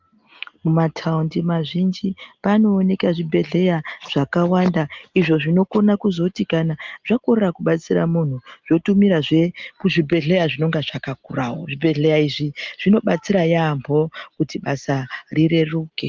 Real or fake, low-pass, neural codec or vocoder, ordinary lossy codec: real; 7.2 kHz; none; Opus, 32 kbps